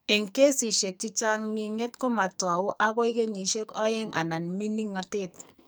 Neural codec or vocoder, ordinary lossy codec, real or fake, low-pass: codec, 44.1 kHz, 2.6 kbps, SNAC; none; fake; none